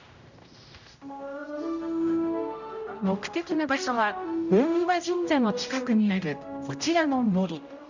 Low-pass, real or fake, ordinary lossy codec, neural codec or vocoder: 7.2 kHz; fake; none; codec, 16 kHz, 0.5 kbps, X-Codec, HuBERT features, trained on general audio